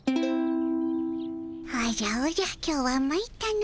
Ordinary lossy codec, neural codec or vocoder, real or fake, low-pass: none; none; real; none